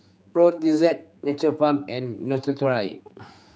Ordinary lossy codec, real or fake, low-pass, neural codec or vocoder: none; fake; none; codec, 16 kHz, 4 kbps, X-Codec, HuBERT features, trained on general audio